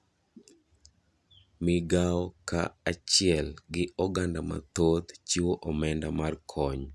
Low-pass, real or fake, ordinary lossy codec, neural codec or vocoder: none; real; none; none